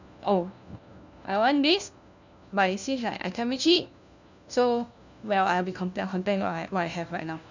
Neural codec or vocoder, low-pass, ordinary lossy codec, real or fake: codec, 16 kHz, 1 kbps, FunCodec, trained on LibriTTS, 50 frames a second; 7.2 kHz; none; fake